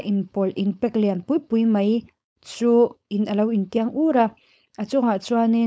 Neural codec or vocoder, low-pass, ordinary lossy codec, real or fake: codec, 16 kHz, 4.8 kbps, FACodec; none; none; fake